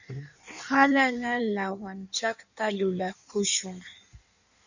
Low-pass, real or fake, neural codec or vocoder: 7.2 kHz; fake; codec, 16 kHz in and 24 kHz out, 1.1 kbps, FireRedTTS-2 codec